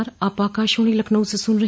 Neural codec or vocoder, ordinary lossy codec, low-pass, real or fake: none; none; none; real